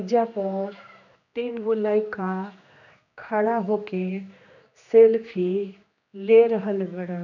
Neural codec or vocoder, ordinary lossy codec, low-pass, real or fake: codec, 16 kHz, 2 kbps, X-Codec, HuBERT features, trained on general audio; none; 7.2 kHz; fake